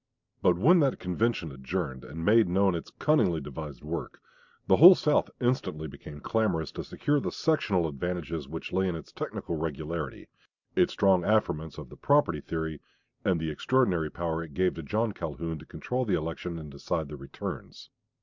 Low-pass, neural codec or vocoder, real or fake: 7.2 kHz; none; real